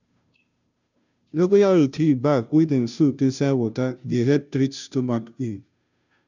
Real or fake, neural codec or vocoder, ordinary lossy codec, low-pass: fake; codec, 16 kHz, 0.5 kbps, FunCodec, trained on Chinese and English, 25 frames a second; none; 7.2 kHz